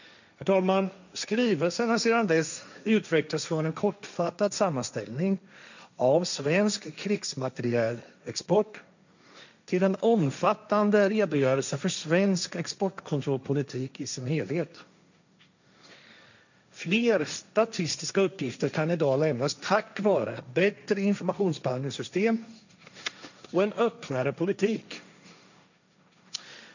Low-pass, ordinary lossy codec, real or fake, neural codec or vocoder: 7.2 kHz; none; fake; codec, 16 kHz, 1.1 kbps, Voila-Tokenizer